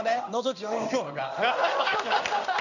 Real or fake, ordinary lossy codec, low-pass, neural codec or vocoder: fake; none; 7.2 kHz; codec, 16 kHz in and 24 kHz out, 1 kbps, XY-Tokenizer